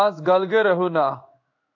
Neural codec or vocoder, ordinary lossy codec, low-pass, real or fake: codec, 16 kHz in and 24 kHz out, 1 kbps, XY-Tokenizer; AAC, 48 kbps; 7.2 kHz; fake